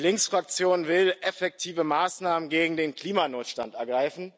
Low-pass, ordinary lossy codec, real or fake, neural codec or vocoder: none; none; real; none